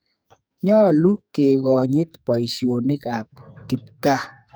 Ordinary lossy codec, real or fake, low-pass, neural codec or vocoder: none; fake; none; codec, 44.1 kHz, 2.6 kbps, SNAC